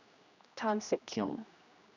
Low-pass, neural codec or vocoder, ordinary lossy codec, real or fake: 7.2 kHz; codec, 16 kHz, 1 kbps, X-Codec, HuBERT features, trained on general audio; none; fake